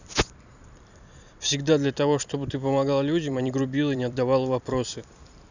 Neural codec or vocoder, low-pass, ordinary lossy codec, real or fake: vocoder, 44.1 kHz, 128 mel bands every 256 samples, BigVGAN v2; 7.2 kHz; none; fake